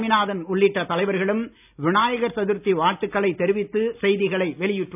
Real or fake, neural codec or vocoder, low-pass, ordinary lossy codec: real; none; 3.6 kHz; none